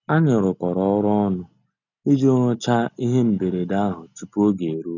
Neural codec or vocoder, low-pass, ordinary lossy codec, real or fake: none; 7.2 kHz; none; real